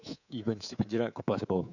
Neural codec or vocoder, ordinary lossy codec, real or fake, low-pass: codec, 24 kHz, 3.1 kbps, DualCodec; none; fake; 7.2 kHz